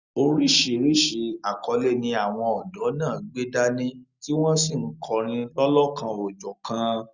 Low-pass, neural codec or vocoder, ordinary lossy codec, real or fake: 7.2 kHz; none; Opus, 64 kbps; real